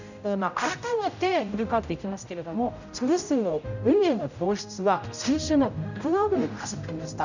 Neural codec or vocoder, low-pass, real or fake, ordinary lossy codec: codec, 16 kHz, 0.5 kbps, X-Codec, HuBERT features, trained on general audio; 7.2 kHz; fake; none